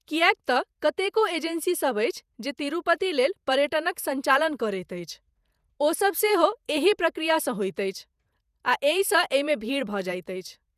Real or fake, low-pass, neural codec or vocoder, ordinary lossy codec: fake; 14.4 kHz; vocoder, 48 kHz, 128 mel bands, Vocos; none